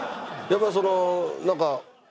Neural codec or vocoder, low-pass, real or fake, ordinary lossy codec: none; none; real; none